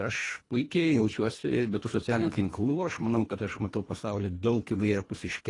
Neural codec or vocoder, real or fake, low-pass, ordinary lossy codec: codec, 24 kHz, 1.5 kbps, HILCodec; fake; 10.8 kHz; AAC, 32 kbps